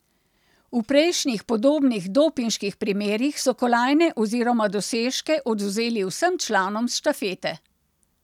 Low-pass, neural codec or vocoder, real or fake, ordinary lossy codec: 19.8 kHz; none; real; none